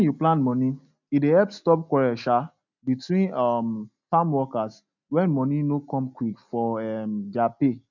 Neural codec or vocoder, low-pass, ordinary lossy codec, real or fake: none; 7.2 kHz; none; real